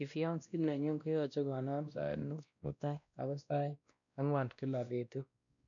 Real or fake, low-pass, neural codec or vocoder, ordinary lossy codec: fake; 7.2 kHz; codec, 16 kHz, 1 kbps, X-Codec, WavLM features, trained on Multilingual LibriSpeech; none